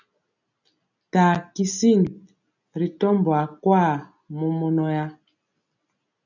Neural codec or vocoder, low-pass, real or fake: none; 7.2 kHz; real